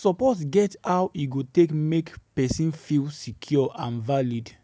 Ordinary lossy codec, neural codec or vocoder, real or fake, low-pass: none; none; real; none